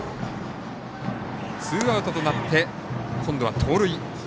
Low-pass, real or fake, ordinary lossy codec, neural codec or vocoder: none; real; none; none